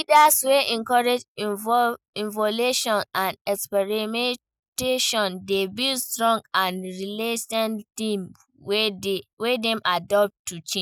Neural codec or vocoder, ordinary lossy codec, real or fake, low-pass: none; none; real; none